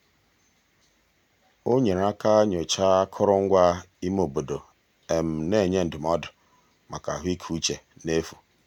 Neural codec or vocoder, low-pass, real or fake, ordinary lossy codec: none; 19.8 kHz; real; none